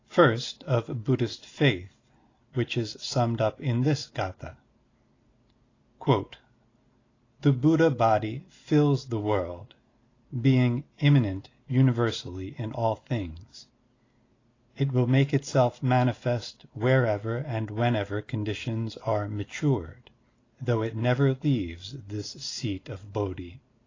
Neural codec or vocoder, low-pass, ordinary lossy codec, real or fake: none; 7.2 kHz; AAC, 32 kbps; real